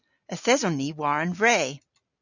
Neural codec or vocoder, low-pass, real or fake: none; 7.2 kHz; real